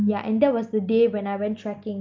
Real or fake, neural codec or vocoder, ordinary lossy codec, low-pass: real; none; Opus, 24 kbps; 7.2 kHz